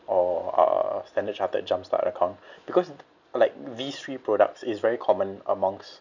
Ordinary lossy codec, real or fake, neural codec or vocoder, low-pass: none; real; none; 7.2 kHz